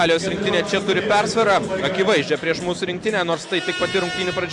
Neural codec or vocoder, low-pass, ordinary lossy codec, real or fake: none; 10.8 kHz; Opus, 64 kbps; real